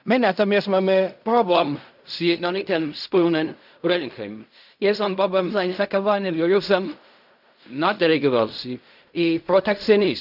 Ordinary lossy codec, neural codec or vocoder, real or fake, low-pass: none; codec, 16 kHz in and 24 kHz out, 0.4 kbps, LongCat-Audio-Codec, fine tuned four codebook decoder; fake; 5.4 kHz